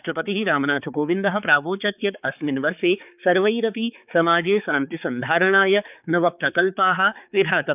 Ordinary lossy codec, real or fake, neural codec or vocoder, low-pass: none; fake; codec, 16 kHz, 4 kbps, X-Codec, HuBERT features, trained on balanced general audio; 3.6 kHz